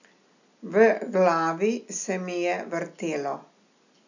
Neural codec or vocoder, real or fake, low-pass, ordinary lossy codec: none; real; 7.2 kHz; none